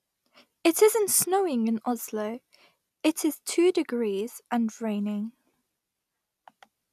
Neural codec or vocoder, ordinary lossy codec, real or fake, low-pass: none; none; real; 14.4 kHz